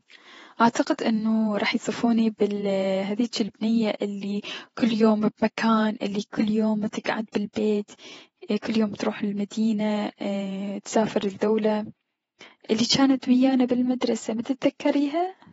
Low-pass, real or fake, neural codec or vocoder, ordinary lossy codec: 19.8 kHz; fake; vocoder, 48 kHz, 128 mel bands, Vocos; AAC, 24 kbps